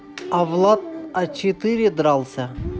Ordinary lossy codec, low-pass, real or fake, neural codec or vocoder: none; none; real; none